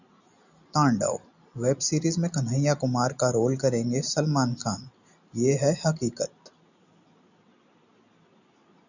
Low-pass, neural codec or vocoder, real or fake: 7.2 kHz; none; real